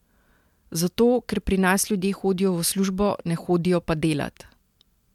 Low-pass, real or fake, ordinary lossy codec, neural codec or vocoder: 19.8 kHz; real; MP3, 96 kbps; none